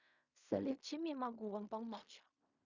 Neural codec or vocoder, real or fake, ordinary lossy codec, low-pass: codec, 16 kHz in and 24 kHz out, 0.4 kbps, LongCat-Audio-Codec, fine tuned four codebook decoder; fake; Opus, 64 kbps; 7.2 kHz